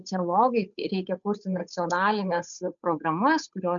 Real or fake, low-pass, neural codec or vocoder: fake; 7.2 kHz; codec, 16 kHz, 8 kbps, FunCodec, trained on Chinese and English, 25 frames a second